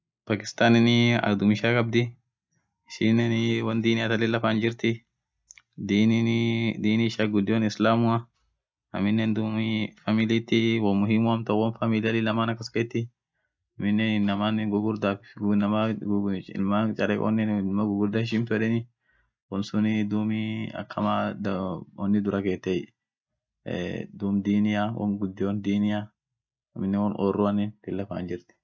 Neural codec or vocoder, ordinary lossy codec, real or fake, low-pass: none; none; real; none